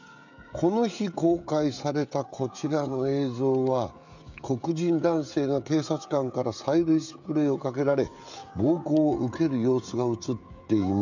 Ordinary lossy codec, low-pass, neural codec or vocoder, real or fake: none; 7.2 kHz; codec, 16 kHz, 16 kbps, FreqCodec, smaller model; fake